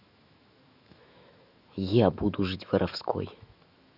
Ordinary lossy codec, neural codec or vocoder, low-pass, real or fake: none; autoencoder, 48 kHz, 128 numbers a frame, DAC-VAE, trained on Japanese speech; 5.4 kHz; fake